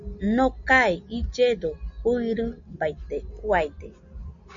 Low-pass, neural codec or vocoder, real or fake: 7.2 kHz; none; real